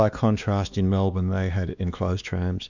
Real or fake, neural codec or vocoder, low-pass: fake; codec, 16 kHz, 2 kbps, X-Codec, WavLM features, trained on Multilingual LibriSpeech; 7.2 kHz